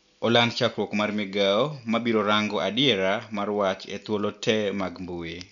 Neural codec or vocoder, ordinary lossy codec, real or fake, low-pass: none; none; real; 7.2 kHz